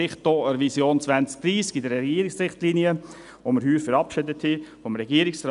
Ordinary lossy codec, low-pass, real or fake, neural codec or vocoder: none; 10.8 kHz; real; none